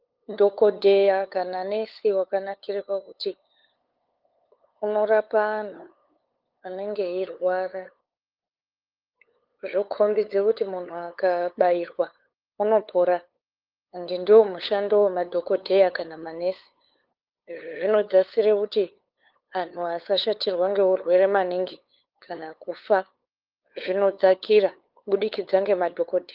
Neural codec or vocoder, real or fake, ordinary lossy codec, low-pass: codec, 16 kHz, 8 kbps, FunCodec, trained on LibriTTS, 25 frames a second; fake; Opus, 32 kbps; 5.4 kHz